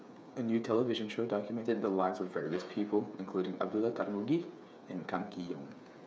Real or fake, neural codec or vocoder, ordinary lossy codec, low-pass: fake; codec, 16 kHz, 8 kbps, FreqCodec, smaller model; none; none